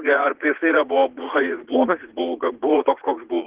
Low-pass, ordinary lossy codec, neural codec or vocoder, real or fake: 3.6 kHz; Opus, 16 kbps; vocoder, 44.1 kHz, 80 mel bands, Vocos; fake